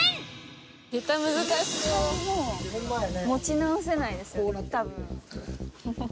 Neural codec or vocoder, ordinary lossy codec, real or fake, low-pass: none; none; real; none